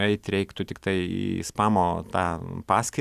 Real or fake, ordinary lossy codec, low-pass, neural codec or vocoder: real; Opus, 64 kbps; 14.4 kHz; none